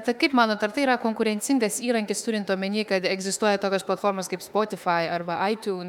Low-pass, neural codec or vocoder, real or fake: 19.8 kHz; autoencoder, 48 kHz, 32 numbers a frame, DAC-VAE, trained on Japanese speech; fake